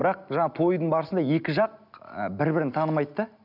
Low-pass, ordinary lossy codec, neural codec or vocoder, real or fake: 5.4 kHz; none; none; real